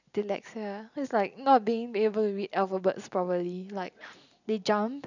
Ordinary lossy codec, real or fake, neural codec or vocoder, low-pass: none; real; none; 7.2 kHz